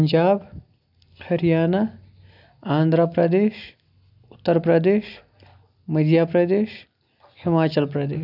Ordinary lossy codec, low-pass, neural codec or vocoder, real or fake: none; 5.4 kHz; none; real